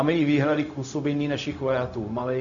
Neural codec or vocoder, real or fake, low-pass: codec, 16 kHz, 0.4 kbps, LongCat-Audio-Codec; fake; 7.2 kHz